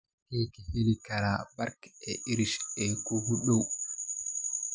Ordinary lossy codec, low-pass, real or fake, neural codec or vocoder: none; none; real; none